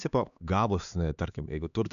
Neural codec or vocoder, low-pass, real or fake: codec, 16 kHz, 2 kbps, X-Codec, HuBERT features, trained on LibriSpeech; 7.2 kHz; fake